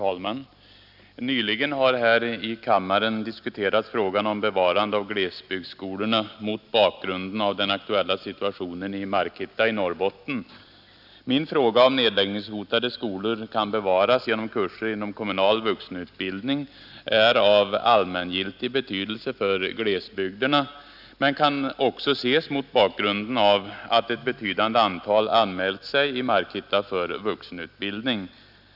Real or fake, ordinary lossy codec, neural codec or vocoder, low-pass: real; none; none; 5.4 kHz